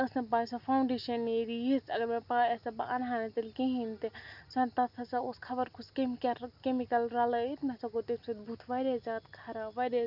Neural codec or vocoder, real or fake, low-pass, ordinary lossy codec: none; real; 5.4 kHz; none